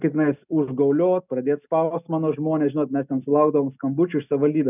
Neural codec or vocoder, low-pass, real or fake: none; 3.6 kHz; real